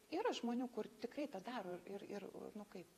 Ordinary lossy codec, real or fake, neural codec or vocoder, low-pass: AAC, 48 kbps; fake; vocoder, 48 kHz, 128 mel bands, Vocos; 14.4 kHz